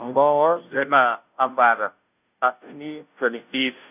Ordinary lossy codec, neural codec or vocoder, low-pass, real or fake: none; codec, 16 kHz, 0.5 kbps, FunCodec, trained on Chinese and English, 25 frames a second; 3.6 kHz; fake